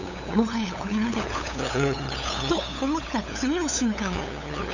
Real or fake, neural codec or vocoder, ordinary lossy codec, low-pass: fake; codec, 16 kHz, 8 kbps, FunCodec, trained on LibriTTS, 25 frames a second; none; 7.2 kHz